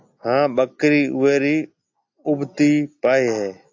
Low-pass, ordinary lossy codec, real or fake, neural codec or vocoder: 7.2 kHz; AAC, 48 kbps; real; none